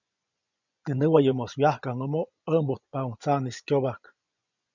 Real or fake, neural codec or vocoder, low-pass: fake; vocoder, 24 kHz, 100 mel bands, Vocos; 7.2 kHz